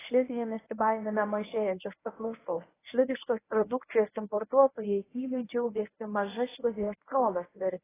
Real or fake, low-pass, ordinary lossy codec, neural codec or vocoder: fake; 3.6 kHz; AAC, 16 kbps; codec, 24 kHz, 0.9 kbps, WavTokenizer, medium speech release version 1